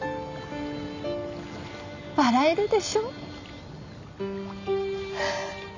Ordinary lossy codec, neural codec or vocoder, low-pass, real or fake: AAC, 48 kbps; none; 7.2 kHz; real